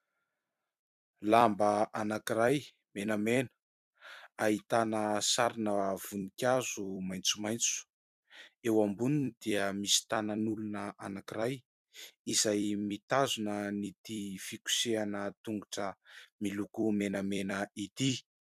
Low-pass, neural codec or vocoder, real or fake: 14.4 kHz; vocoder, 44.1 kHz, 128 mel bands every 256 samples, BigVGAN v2; fake